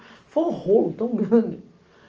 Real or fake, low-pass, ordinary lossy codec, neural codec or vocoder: real; 7.2 kHz; Opus, 24 kbps; none